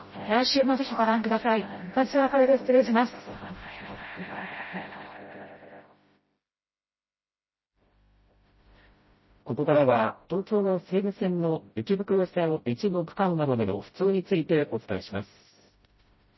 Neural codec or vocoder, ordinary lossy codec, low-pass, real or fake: codec, 16 kHz, 0.5 kbps, FreqCodec, smaller model; MP3, 24 kbps; 7.2 kHz; fake